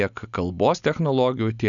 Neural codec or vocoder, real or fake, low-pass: none; real; 7.2 kHz